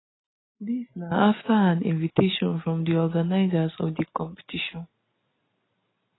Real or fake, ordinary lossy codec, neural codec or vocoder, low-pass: real; AAC, 16 kbps; none; 7.2 kHz